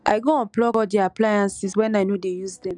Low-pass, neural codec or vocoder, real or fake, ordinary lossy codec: 10.8 kHz; none; real; none